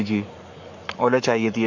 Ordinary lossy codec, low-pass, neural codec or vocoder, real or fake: none; 7.2 kHz; codec, 44.1 kHz, 7.8 kbps, Pupu-Codec; fake